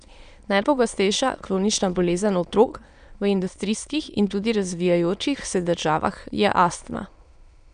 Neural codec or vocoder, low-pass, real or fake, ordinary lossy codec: autoencoder, 22.05 kHz, a latent of 192 numbers a frame, VITS, trained on many speakers; 9.9 kHz; fake; none